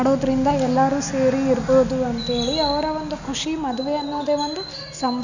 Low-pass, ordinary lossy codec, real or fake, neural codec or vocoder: 7.2 kHz; none; real; none